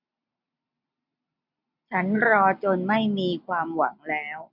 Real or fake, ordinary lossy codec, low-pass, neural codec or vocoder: real; MP3, 48 kbps; 5.4 kHz; none